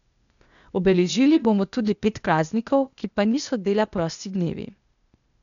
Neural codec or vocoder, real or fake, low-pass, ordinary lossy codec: codec, 16 kHz, 0.8 kbps, ZipCodec; fake; 7.2 kHz; none